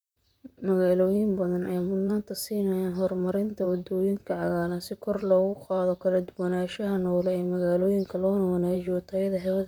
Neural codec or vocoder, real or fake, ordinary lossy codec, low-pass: vocoder, 44.1 kHz, 128 mel bands, Pupu-Vocoder; fake; none; none